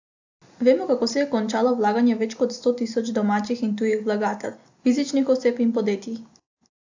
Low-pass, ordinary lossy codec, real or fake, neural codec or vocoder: 7.2 kHz; AAC, 48 kbps; real; none